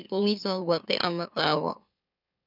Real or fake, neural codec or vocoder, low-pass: fake; autoencoder, 44.1 kHz, a latent of 192 numbers a frame, MeloTTS; 5.4 kHz